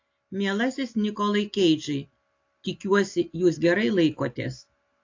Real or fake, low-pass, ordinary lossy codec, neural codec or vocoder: real; 7.2 kHz; AAC, 48 kbps; none